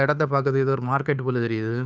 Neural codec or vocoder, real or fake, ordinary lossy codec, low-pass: codec, 16 kHz, 2 kbps, FunCodec, trained on Chinese and English, 25 frames a second; fake; none; none